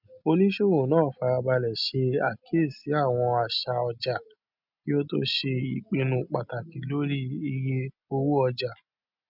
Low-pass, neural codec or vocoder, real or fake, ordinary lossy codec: 5.4 kHz; none; real; none